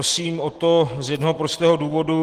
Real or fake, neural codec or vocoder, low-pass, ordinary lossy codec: real; none; 14.4 kHz; Opus, 16 kbps